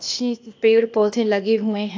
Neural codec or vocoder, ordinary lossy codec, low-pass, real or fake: codec, 16 kHz, 0.8 kbps, ZipCodec; AAC, 48 kbps; 7.2 kHz; fake